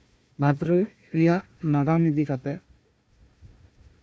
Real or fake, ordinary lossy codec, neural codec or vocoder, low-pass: fake; none; codec, 16 kHz, 1 kbps, FunCodec, trained on Chinese and English, 50 frames a second; none